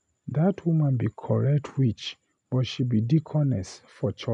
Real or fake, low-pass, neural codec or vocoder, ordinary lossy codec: real; 10.8 kHz; none; none